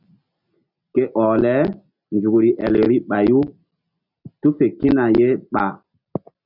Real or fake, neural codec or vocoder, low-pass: real; none; 5.4 kHz